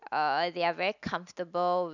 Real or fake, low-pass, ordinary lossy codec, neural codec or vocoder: real; 7.2 kHz; none; none